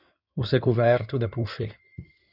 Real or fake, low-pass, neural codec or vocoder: fake; 5.4 kHz; codec, 16 kHz in and 24 kHz out, 2.2 kbps, FireRedTTS-2 codec